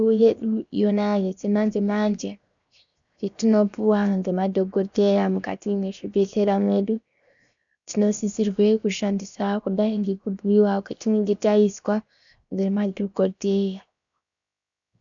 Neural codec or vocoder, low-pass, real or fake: codec, 16 kHz, 0.7 kbps, FocalCodec; 7.2 kHz; fake